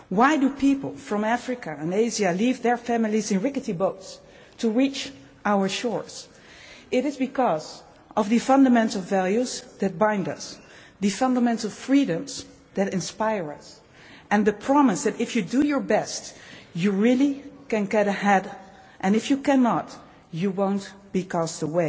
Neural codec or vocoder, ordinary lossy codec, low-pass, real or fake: none; none; none; real